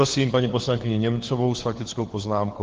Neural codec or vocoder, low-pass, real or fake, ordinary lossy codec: codec, 16 kHz, 4 kbps, FunCodec, trained on LibriTTS, 50 frames a second; 7.2 kHz; fake; Opus, 16 kbps